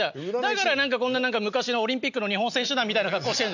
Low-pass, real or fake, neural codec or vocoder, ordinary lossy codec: 7.2 kHz; real; none; none